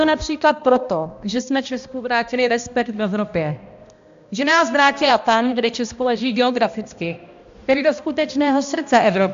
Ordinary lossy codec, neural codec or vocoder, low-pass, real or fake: AAC, 64 kbps; codec, 16 kHz, 1 kbps, X-Codec, HuBERT features, trained on balanced general audio; 7.2 kHz; fake